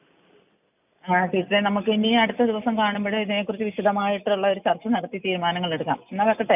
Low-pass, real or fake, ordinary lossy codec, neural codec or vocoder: 3.6 kHz; real; none; none